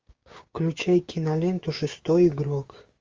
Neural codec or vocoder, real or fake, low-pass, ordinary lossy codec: codec, 16 kHz, 6 kbps, DAC; fake; 7.2 kHz; Opus, 32 kbps